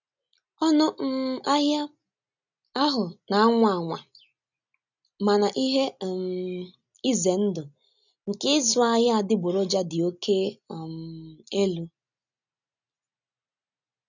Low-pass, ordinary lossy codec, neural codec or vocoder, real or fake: 7.2 kHz; none; none; real